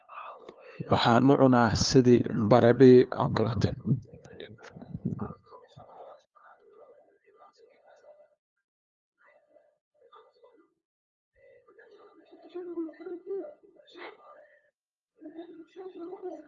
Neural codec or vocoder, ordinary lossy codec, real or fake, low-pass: codec, 16 kHz, 2 kbps, FunCodec, trained on LibriTTS, 25 frames a second; Opus, 32 kbps; fake; 7.2 kHz